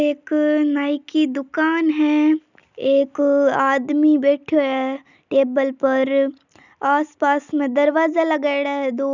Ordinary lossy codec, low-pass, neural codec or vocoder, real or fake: none; 7.2 kHz; none; real